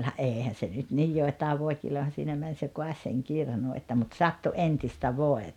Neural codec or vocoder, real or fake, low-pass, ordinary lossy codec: none; real; 19.8 kHz; none